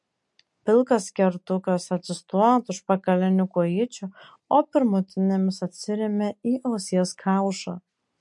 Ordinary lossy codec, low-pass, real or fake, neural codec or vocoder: MP3, 48 kbps; 10.8 kHz; real; none